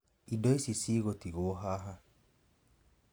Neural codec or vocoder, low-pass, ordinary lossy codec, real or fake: none; none; none; real